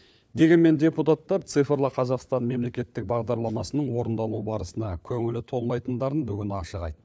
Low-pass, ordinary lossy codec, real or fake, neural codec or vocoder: none; none; fake; codec, 16 kHz, 4 kbps, FunCodec, trained on LibriTTS, 50 frames a second